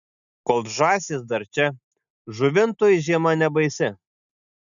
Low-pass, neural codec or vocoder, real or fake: 7.2 kHz; none; real